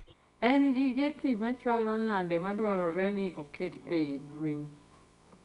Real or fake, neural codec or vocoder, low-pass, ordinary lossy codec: fake; codec, 24 kHz, 0.9 kbps, WavTokenizer, medium music audio release; 10.8 kHz; none